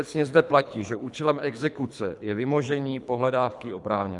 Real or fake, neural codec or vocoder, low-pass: fake; codec, 24 kHz, 3 kbps, HILCodec; 10.8 kHz